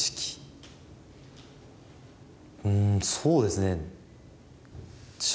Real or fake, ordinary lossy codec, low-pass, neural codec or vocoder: real; none; none; none